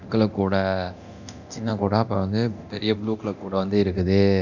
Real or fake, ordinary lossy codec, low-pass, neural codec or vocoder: fake; none; 7.2 kHz; codec, 24 kHz, 0.9 kbps, DualCodec